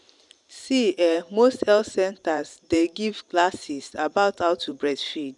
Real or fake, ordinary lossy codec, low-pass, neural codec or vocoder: fake; none; 10.8 kHz; vocoder, 44.1 kHz, 128 mel bands every 512 samples, BigVGAN v2